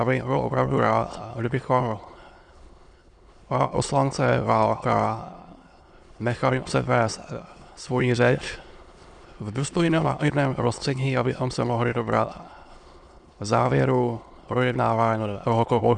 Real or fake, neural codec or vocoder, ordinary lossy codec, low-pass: fake; autoencoder, 22.05 kHz, a latent of 192 numbers a frame, VITS, trained on many speakers; Opus, 64 kbps; 9.9 kHz